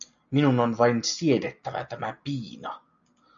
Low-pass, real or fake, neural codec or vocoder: 7.2 kHz; real; none